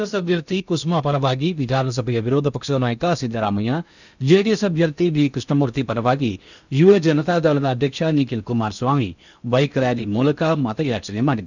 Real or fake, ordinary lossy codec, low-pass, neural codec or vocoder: fake; none; 7.2 kHz; codec, 16 kHz in and 24 kHz out, 0.8 kbps, FocalCodec, streaming, 65536 codes